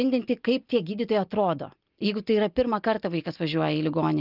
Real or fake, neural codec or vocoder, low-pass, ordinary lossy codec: real; none; 5.4 kHz; Opus, 24 kbps